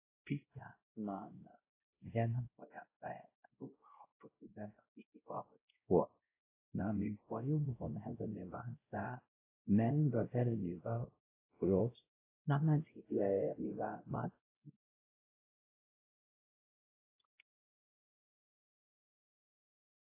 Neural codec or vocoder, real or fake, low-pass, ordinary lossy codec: codec, 16 kHz, 0.5 kbps, X-Codec, WavLM features, trained on Multilingual LibriSpeech; fake; 3.6 kHz; AAC, 24 kbps